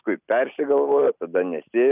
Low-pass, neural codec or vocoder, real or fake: 3.6 kHz; none; real